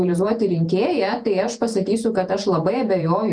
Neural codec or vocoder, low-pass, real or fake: vocoder, 48 kHz, 128 mel bands, Vocos; 9.9 kHz; fake